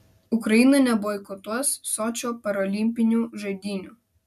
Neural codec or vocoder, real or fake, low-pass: none; real; 14.4 kHz